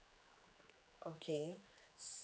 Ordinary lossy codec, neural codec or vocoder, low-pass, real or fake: none; codec, 16 kHz, 2 kbps, X-Codec, HuBERT features, trained on general audio; none; fake